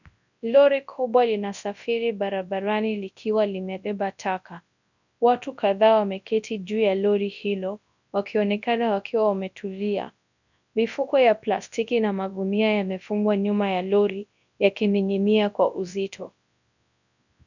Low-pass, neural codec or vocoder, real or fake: 7.2 kHz; codec, 24 kHz, 0.9 kbps, WavTokenizer, large speech release; fake